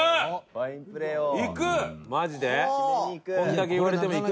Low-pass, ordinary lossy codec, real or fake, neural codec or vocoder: none; none; real; none